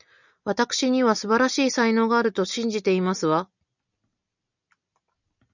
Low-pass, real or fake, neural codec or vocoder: 7.2 kHz; real; none